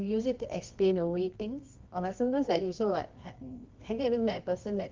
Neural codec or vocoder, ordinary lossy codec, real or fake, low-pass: codec, 24 kHz, 0.9 kbps, WavTokenizer, medium music audio release; Opus, 32 kbps; fake; 7.2 kHz